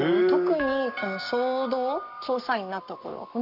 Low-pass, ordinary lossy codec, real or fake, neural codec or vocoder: 5.4 kHz; none; fake; codec, 44.1 kHz, 7.8 kbps, Pupu-Codec